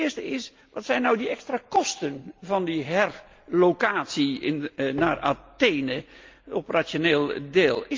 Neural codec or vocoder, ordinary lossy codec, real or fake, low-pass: none; Opus, 24 kbps; real; 7.2 kHz